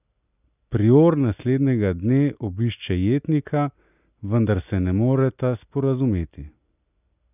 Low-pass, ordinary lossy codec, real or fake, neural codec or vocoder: 3.6 kHz; none; real; none